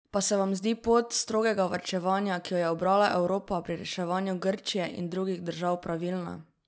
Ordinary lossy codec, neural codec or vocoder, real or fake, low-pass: none; none; real; none